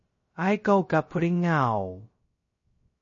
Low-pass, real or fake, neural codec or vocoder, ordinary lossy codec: 7.2 kHz; fake; codec, 16 kHz, 0.2 kbps, FocalCodec; MP3, 32 kbps